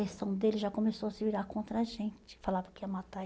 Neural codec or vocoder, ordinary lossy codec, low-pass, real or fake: none; none; none; real